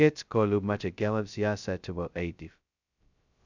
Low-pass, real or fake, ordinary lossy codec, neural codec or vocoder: 7.2 kHz; fake; none; codec, 16 kHz, 0.2 kbps, FocalCodec